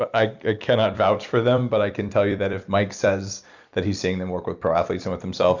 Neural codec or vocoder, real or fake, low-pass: vocoder, 44.1 kHz, 128 mel bands every 256 samples, BigVGAN v2; fake; 7.2 kHz